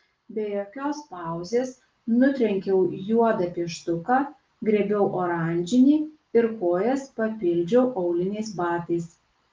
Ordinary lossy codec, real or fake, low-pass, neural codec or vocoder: Opus, 24 kbps; real; 7.2 kHz; none